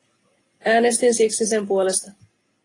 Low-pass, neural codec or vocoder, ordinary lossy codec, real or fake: 10.8 kHz; none; AAC, 32 kbps; real